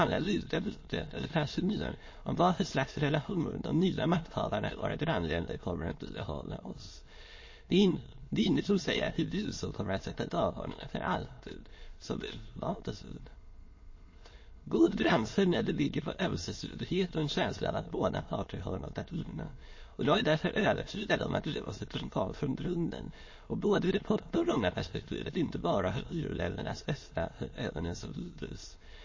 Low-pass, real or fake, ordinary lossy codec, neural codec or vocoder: 7.2 kHz; fake; MP3, 32 kbps; autoencoder, 22.05 kHz, a latent of 192 numbers a frame, VITS, trained on many speakers